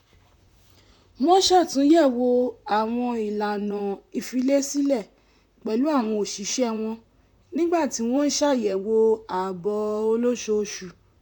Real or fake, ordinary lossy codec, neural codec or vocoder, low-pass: fake; none; vocoder, 44.1 kHz, 128 mel bands, Pupu-Vocoder; 19.8 kHz